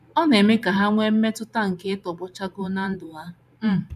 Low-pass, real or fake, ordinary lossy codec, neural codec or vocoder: 14.4 kHz; fake; none; vocoder, 44.1 kHz, 128 mel bands every 512 samples, BigVGAN v2